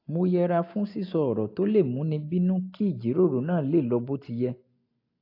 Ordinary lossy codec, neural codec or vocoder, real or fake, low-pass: AAC, 32 kbps; none; real; 5.4 kHz